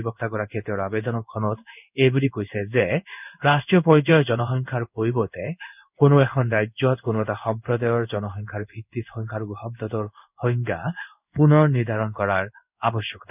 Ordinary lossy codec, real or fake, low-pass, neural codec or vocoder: none; fake; 3.6 kHz; codec, 16 kHz in and 24 kHz out, 1 kbps, XY-Tokenizer